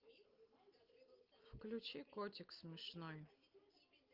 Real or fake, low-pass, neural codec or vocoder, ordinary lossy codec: real; 5.4 kHz; none; Opus, 32 kbps